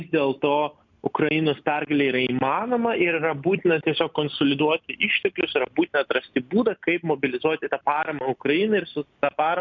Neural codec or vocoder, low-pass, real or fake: none; 7.2 kHz; real